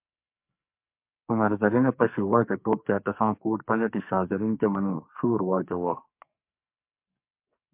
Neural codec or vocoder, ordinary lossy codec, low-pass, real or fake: codec, 44.1 kHz, 2.6 kbps, SNAC; MP3, 32 kbps; 3.6 kHz; fake